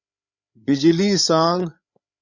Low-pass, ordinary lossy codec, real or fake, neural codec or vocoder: 7.2 kHz; Opus, 64 kbps; fake; codec, 16 kHz, 8 kbps, FreqCodec, larger model